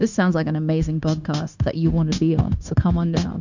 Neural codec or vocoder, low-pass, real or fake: codec, 16 kHz, 0.9 kbps, LongCat-Audio-Codec; 7.2 kHz; fake